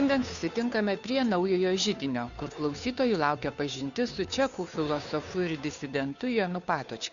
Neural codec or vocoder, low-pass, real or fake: codec, 16 kHz, 2 kbps, FunCodec, trained on Chinese and English, 25 frames a second; 7.2 kHz; fake